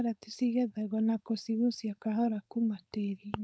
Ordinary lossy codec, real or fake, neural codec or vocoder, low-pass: none; fake; codec, 16 kHz, 4.8 kbps, FACodec; none